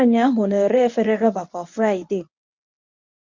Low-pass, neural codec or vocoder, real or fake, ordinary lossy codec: 7.2 kHz; codec, 24 kHz, 0.9 kbps, WavTokenizer, medium speech release version 1; fake; none